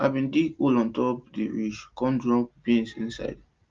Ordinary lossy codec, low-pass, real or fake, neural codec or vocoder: Opus, 32 kbps; 7.2 kHz; real; none